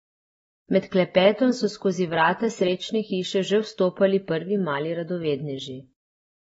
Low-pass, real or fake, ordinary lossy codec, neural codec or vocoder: 14.4 kHz; real; AAC, 24 kbps; none